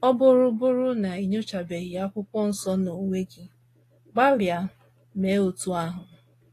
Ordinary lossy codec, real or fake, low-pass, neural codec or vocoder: AAC, 48 kbps; real; 14.4 kHz; none